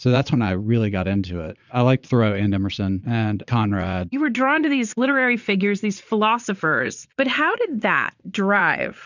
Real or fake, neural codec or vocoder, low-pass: fake; vocoder, 22.05 kHz, 80 mel bands, Vocos; 7.2 kHz